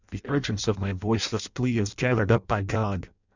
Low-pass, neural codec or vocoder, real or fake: 7.2 kHz; codec, 16 kHz in and 24 kHz out, 0.6 kbps, FireRedTTS-2 codec; fake